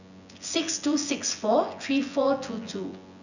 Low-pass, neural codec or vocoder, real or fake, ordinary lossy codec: 7.2 kHz; vocoder, 24 kHz, 100 mel bands, Vocos; fake; none